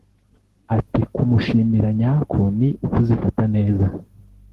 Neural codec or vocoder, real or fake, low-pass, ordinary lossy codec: codec, 44.1 kHz, 7.8 kbps, Pupu-Codec; fake; 14.4 kHz; Opus, 16 kbps